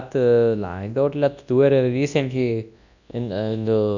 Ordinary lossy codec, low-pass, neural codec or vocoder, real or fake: none; 7.2 kHz; codec, 24 kHz, 0.9 kbps, WavTokenizer, large speech release; fake